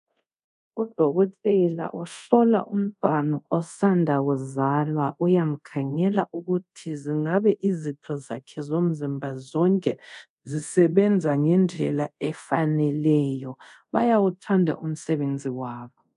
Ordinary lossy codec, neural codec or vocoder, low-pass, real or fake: MP3, 96 kbps; codec, 24 kHz, 0.5 kbps, DualCodec; 10.8 kHz; fake